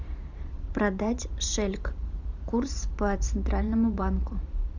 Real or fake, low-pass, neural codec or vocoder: fake; 7.2 kHz; vocoder, 44.1 kHz, 128 mel bands every 512 samples, BigVGAN v2